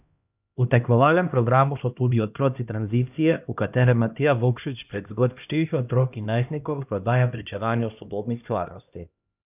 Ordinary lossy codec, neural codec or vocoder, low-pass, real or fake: none; codec, 16 kHz, 1 kbps, X-Codec, HuBERT features, trained on LibriSpeech; 3.6 kHz; fake